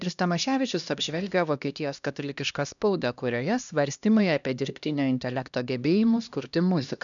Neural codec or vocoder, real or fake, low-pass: codec, 16 kHz, 1 kbps, X-Codec, HuBERT features, trained on LibriSpeech; fake; 7.2 kHz